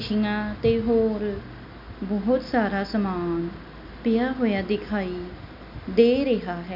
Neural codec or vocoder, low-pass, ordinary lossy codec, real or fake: none; 5.4 kHz; none; real